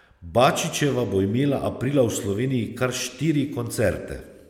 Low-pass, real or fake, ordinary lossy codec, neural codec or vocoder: 19.8 kHz; real; MP3, 96 kbps; none